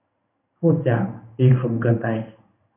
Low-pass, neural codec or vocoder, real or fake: 3.6 kHz; codec, 16 kHz in and 24 kHz out, 1 kbps, XY-Tokenizer; fake